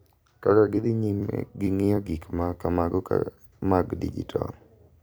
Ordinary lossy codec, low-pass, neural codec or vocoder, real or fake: none; none; vocoder, 44.1 kHz, 128 mel bands every 256 samples, BigVGAN v2; fake